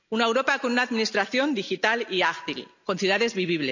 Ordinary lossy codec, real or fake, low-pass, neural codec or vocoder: none; real; 7.2 kHz; none